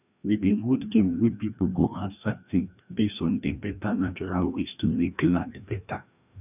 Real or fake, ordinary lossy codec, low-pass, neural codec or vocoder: fake; none; 3.6 kHz; codec, 16 kHz, 1 kbps, FreqCodec, larger model